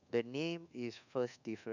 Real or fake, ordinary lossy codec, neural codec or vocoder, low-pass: fake; none; codec, 24 kHz, 1.2 kbps, DualCodec; 7.2 kHz